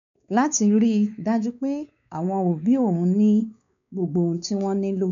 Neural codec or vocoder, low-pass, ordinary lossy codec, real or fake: codec, 16 kHz, 4 kbps, X-Codec, WavLM features, trained on Multilingual LibriSpeech; 7.2 kHz; none; fake